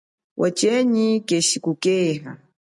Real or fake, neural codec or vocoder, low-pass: real; none; 10.8 kHz